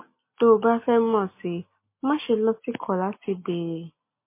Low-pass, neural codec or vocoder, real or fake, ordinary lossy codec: 3.6 kHz; none; real; MP3, 24 kbps